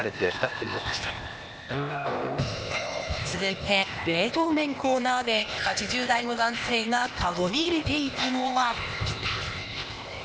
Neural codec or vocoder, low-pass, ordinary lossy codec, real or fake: codec, 16 kHz, 0.8 kbps, ZipCodec; none; none; fake